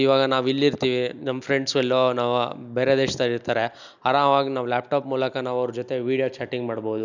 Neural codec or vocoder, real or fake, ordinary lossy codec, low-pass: none; real; none; 7.2 kHz